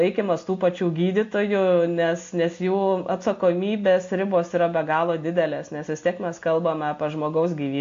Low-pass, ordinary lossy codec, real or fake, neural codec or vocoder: 7.2 kHz; AAC, 48 kbps; real; none